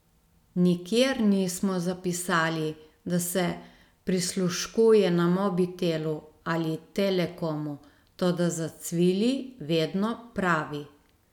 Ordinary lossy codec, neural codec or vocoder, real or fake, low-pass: none; none; real; 19.8 kHz